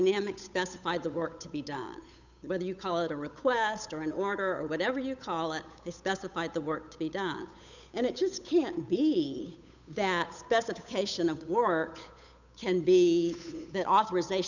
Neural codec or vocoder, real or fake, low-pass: codec, 16 kHz, 8 kbps, FunCodec, trained on Chinese and English, 25 frames a second; fake; 7.2 kHz